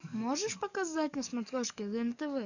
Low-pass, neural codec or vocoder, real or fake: 7.2 kHz; codec, 44.1 kHz, 7.8 kbps, DAC; fake